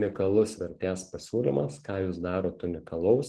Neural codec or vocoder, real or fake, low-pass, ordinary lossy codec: none; real; 10.8 kHz; Opus, 16 kbps